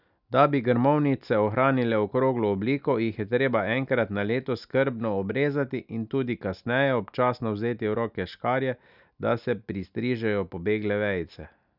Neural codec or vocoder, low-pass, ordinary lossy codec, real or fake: none; 5.4 kHz; none; real